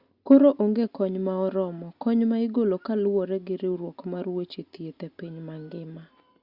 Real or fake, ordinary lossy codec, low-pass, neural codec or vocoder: real; Opus, 64 kbps; 5.4 kHz; none